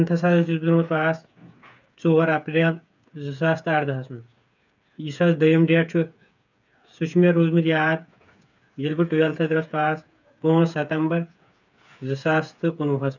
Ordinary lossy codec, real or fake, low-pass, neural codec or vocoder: none; fake; 7.2 kHz; codec, 16 kHz, 8 kbps, FreqCodec, smaller model